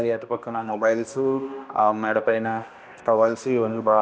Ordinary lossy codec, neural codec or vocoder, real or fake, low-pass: none; codec, 16 kHz, 1 kbps, X-Codec, HuBERT features, trained on balanced general audio; fake; none